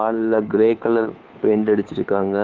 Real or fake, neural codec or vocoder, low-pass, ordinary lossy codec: fake; codec, 16 kHz, 2 kbps, FunCodec, trained on Chinese and English, 25 frames a second; 7.2 kHz; Opus, 24 kbps